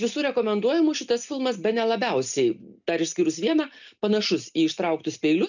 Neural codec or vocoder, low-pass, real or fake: none; 7.2 kHz; real